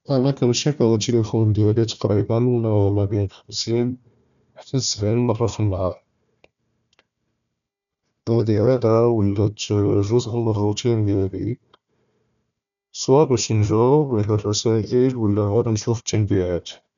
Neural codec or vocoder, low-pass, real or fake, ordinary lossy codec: codec, 16 kHz, 1 kbps, FunCodec, trained on Chinese and English, 50 frames a second; 7.2 kHz; fake; none